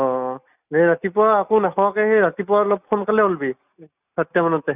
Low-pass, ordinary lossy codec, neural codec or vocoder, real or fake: 3.6 kHz; none; none; real